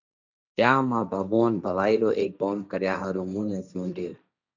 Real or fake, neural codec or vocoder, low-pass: fake; codec, 16 kHz, 1.1 kbps, Voila-Tokenizer; 7.2 kHz